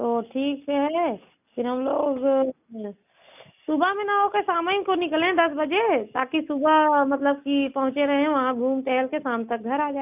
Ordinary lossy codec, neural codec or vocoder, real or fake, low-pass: none; none; real; 3.6 kHz